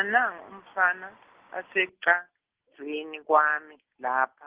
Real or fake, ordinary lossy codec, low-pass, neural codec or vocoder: real; Opus, 16 kbps; 3.6 kHz; none